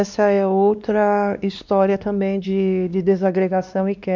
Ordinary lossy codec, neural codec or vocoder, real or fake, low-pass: none; codec, 16 kHz, 2 kbps, X-Codec, WavLM features, trained on Multilingual LibriSpeech; fake; 7.2 kHz